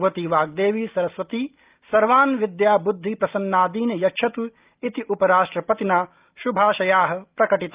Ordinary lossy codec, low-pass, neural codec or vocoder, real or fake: Opus, 32 kbps; 3.6 kHz; none; real